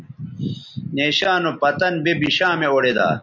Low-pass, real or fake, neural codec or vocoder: 7.2 kHz; real; none